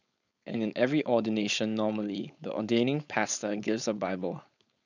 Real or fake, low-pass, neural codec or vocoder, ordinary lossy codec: fake; 7.2 kHz; codec, 16 kHz, 4.8 kbps, FACodec; none